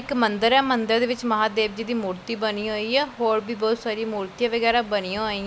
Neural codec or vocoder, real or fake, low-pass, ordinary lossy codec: none; real; none; none